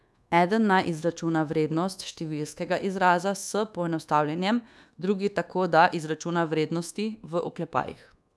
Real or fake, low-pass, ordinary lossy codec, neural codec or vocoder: fake; none; none; codec, 24 kHz, 1.2 kbps, DualCodec